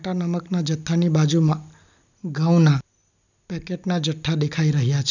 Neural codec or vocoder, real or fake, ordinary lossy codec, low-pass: none; real; none; 7.2 kHz